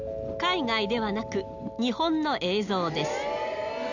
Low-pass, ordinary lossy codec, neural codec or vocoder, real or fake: 7.2 kHz; none; none; real